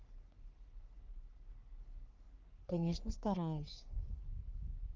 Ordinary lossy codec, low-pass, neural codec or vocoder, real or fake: Opus, 24 kbps; 7.2 kHz; codec, 44.1 kHz, 3.4 kbps, Pupu-Codec; fake